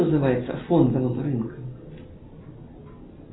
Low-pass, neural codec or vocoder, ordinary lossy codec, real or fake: 7.2 kHz; none; AAC, 16 kbps; real